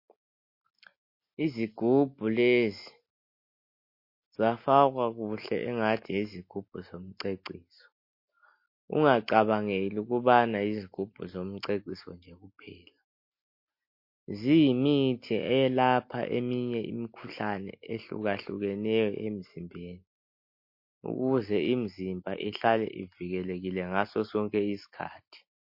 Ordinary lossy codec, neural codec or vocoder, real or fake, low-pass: MP3, 32 kbps; none; real; 5.4 kHz